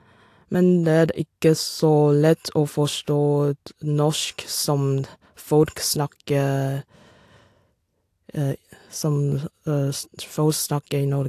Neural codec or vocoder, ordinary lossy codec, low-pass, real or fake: none; AAC, 64 kbps; 14.4 kHz; real